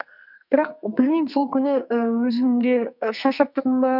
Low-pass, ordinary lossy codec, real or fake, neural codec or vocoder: 5.4 kHz; none; fake; codec, 44.1 kHz, 2.6 kbps, SNAC